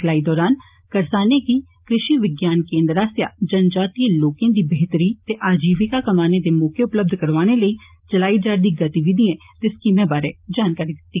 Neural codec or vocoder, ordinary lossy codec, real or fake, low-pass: none; Opus, 24 kbps; real; 3.6 kHz